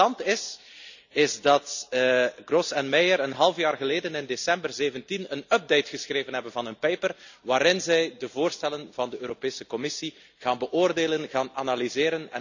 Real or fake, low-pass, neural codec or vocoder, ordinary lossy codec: real; 7.2 kHz; none; none